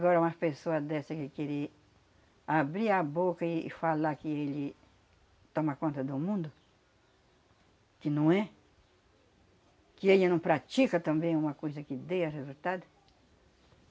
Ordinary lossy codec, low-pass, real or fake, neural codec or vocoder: none; none; real; none